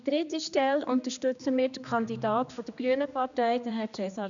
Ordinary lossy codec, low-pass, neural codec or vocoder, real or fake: none; 7.2 kHz; codec, 16 kHz, 2 kbps, X-Codec, HuBERT features, trained on general audio; fake